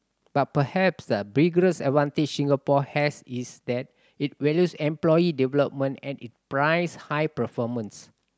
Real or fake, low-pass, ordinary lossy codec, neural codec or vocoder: real; none; none; none